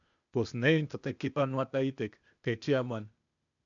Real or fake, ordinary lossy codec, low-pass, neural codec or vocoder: fake; MP3, 96 kbps; 7.2 kHz; codec, 16 kHz, 0.8 kbps, ZipCodec